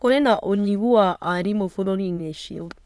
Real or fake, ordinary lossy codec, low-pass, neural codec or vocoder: fake; none; none; autoencoder, 22.05 kHz, a latent of 192 numbers a frame, VITS, trained on many speakers